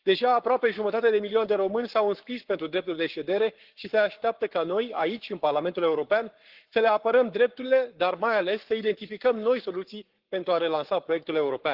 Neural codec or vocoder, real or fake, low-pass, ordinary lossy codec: codec, 44.1 kHz, 7.8 kbps, Pupu-Codec; fake; 5.4 kHz; Opus, 16 kbps